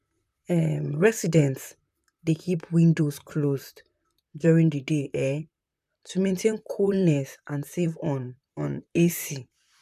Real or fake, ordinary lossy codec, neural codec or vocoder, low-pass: fake; none; vocoder, 44.1 kHz, 128 mel bands, Pupu-Vocoder; 14.4 kHz